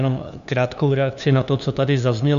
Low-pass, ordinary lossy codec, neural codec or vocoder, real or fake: 7.2 kHz; MP3, 96 kbps; codec, 16 kHz, 2 kbps, FunCodec, trained on LibriTTS, 25 frames a second; fake